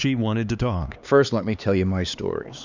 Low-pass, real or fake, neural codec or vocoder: 7.2 kHz; fake; codec, 16 kHz, 2 kbps, X-Codec, HuBERT features, trained on LibriSpeech